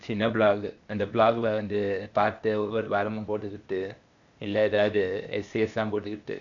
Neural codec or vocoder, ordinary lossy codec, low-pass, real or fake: codec, 16 kHz, 0.8 kbps, ZipCodec; none; 7.2 kHz; fake